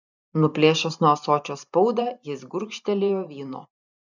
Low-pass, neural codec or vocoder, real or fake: 7.2 kHz; vocoder, 44.1 kHz, 128 mel bands every 512 samples, BigVGAN v2; fake